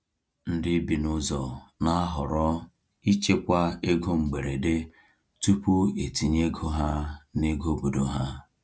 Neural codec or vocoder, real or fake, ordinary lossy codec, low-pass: none; real; none; none